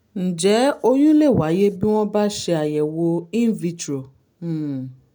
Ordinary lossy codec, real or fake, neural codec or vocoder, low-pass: none; real; none; none